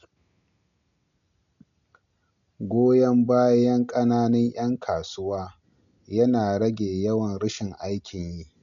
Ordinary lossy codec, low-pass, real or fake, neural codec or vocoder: none; 7.2 kHz; real; none